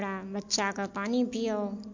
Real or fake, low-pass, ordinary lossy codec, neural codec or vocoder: real; 7.2 kHz; MP3, 48 kbps; none